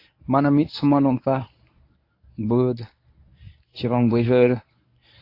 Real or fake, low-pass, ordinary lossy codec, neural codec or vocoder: fake; 5.4 kHz; AAC, 32 kbps; codec, 24 kHz, 0.9 kbps, WavTokenizer, medium speech release version 1